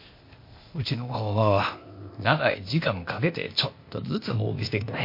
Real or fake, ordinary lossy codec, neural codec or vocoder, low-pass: fake; MP3, 32 kbps; codec, 16 kHz, 0.8 kbps, ZipCodec; 5.4 kHz